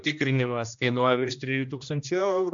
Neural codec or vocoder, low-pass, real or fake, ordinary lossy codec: codec, 16 kHz, 1 kbps, X-Codec, HuBERT features, trained on general audio; 7.2 kHz; fake; AAC, 64 kbps